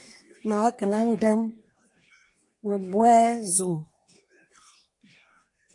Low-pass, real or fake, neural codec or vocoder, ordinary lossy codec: 10.8 kHz; fake; codec, 24 kHz, 1 kbps, SNAC; AAC, 48 kbps